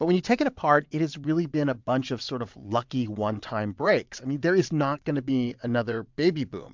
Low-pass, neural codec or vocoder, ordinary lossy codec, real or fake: 7.2 kHz; vocoder, 22.05 kHz, 80 mel bands, WaveNeXt; MP3, 64 kbps; fake